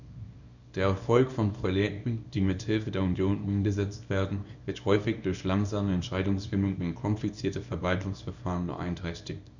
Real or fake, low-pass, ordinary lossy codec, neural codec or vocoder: fake; 7.2 kHz; none; codec, 24 kHz, 0.9 kbps, WavTokenizer, small release